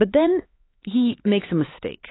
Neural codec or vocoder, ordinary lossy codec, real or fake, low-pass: codec, 16 kHz, 4 kbps, X-Codec, HuBERT features, trained on LibriSpeech; AAC, 16 kbps; fake; 7.2 kHz